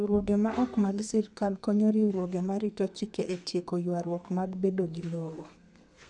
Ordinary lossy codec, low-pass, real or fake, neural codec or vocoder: none; 10.8 kHz; fake; codec, 32 kHz, 1.9 kbps, SNAC